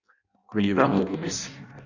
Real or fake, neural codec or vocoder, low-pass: fake; codec, 16 kHz in and 24 kHz out, 0.6 kbps, FireRedTTS-2 codec; 7.2 kHz